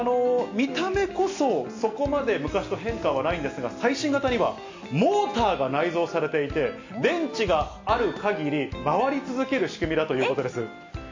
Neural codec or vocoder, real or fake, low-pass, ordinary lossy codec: none; real; 7.2 kHz; AAC, 48 kbps